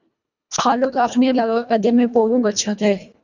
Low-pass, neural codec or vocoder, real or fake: 7.2 kHz; codec, 24 kHz, 1.5 kbps, HILCodec; fake